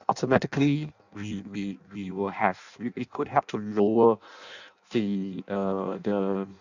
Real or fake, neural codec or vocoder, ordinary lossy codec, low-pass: fake; codec, 16 kHz in and 24 kHz out, 0.6 kbps, FireRedTTS-2 codec; none; 7.2 kHz